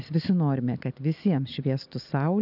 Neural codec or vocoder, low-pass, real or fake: none; 5.4 kHz; real